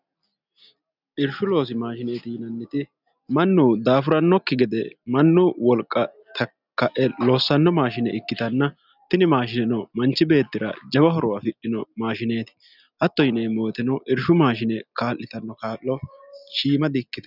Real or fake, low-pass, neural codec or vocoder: real; 5.4 kHz; none